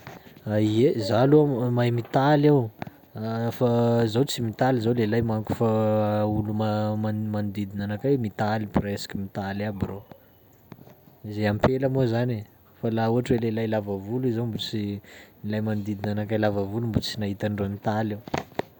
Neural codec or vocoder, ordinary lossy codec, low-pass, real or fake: none; none; 19.8 kHz; real